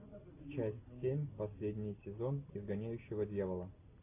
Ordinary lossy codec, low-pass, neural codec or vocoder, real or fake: AAC, 24 kbps; 3.6 kHz; none; real